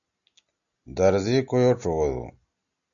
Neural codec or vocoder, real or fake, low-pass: none; real; 7.2 kHz